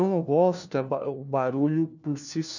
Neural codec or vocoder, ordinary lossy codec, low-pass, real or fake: codec, 16 kHz, 1 kbps, FunCodec, trained on LibriTTS, 50 frames a second; none; 7.2 kHz; fake